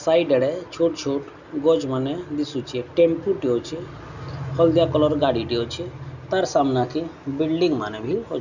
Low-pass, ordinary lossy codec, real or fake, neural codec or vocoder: 7.2 kHz; none; real; none